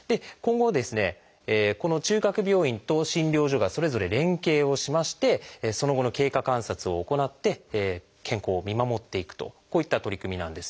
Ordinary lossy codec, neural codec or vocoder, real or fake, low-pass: none; none; real; none